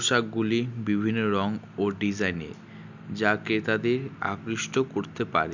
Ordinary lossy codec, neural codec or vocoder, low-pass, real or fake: none; none; 7.2 kHz; real